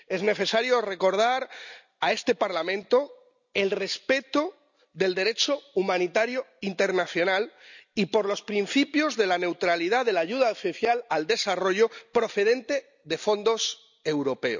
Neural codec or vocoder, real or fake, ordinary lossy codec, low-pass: none; real; none; 7.2 kHz